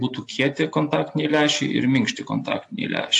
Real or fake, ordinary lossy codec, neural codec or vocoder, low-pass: real; AAC, 64 kbps; none; 10.8 kHz